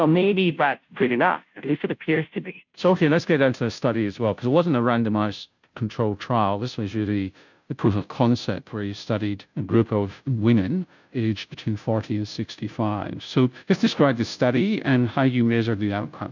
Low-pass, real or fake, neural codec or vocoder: 7.2 kHz; fake; codec, 16 kHz, 0.5 kbps, FunCodec, trained on Chinese and English, 25 frames a second